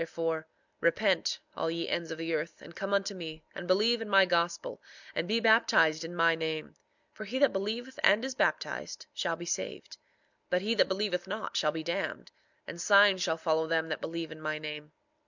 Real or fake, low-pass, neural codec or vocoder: real; 7.2 kHz; none